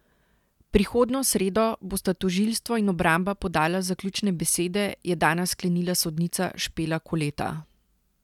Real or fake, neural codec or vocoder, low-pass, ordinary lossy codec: real; none; 19.8 kHz; none